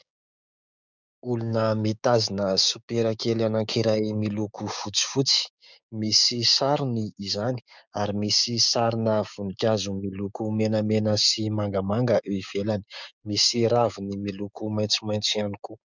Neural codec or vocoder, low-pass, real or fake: codec, 16 kHz, 6 kbps, DAC; 7.2 kHz; fake